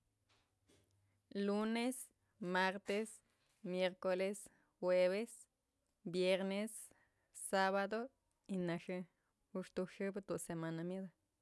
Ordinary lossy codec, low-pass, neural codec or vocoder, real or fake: none; none; none; real